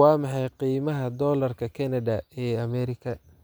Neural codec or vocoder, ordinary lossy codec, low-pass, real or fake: none; none; none; real